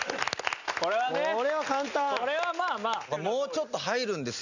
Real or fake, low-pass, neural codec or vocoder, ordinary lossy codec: real; 7.2 kHz; none; none